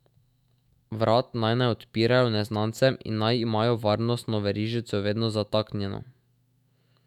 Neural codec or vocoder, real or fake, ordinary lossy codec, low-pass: autoencoder, 48 kHz, 128 numbers a frame, DAC-VAE, trained on Japanese speech; fake; none; 19.8 kHz